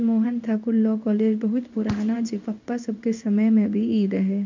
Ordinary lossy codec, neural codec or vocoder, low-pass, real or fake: MP3, 64 kbps; codec, 16 kHz in and 24 kHz out, 1 kbps, XY-Tokenizer; 7.2 kHz; fake